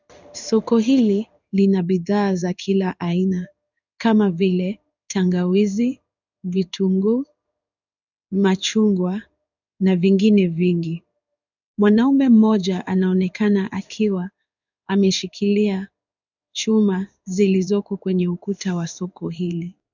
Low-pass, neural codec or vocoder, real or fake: 7.2 kHz; codec, 16 kHz in and 24 kHz out, 1 kbps, XY-Tokenizer; fake